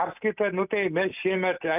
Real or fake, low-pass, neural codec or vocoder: real; 3.6 kHz; none